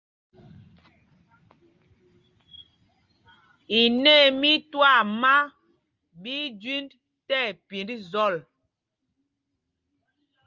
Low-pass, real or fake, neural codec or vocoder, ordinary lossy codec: 7.2 kHz; real; none; Opus, 32 kbps